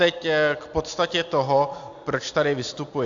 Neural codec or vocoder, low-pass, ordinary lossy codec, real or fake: none; 7.2 kHz; AAC, 64 kbps; real